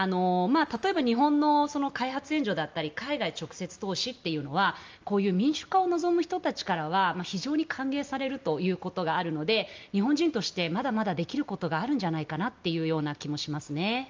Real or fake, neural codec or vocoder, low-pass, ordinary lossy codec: real; none; 7.2 kHz; Opus, 24 kbps